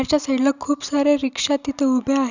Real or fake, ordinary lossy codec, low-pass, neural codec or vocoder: real; none; 7.2 kHz; none